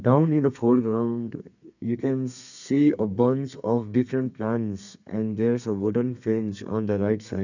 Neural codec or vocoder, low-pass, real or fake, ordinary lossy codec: codec, 32 kHz, 1.9 kbps, SNAC; 7.2 kHz; fake; none